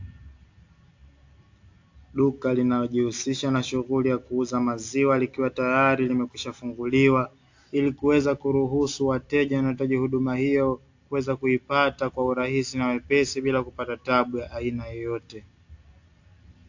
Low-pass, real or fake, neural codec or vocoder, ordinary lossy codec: 7.2 kHz; real; none; AAC, 48 kbps